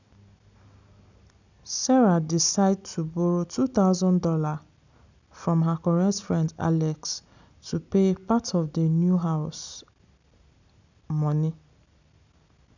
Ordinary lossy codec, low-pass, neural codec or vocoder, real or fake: none; 7.2 kHz; none; real